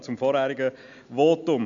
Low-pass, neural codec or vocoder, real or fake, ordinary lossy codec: 7.2 kHz; none; real; MP3, 64 kbps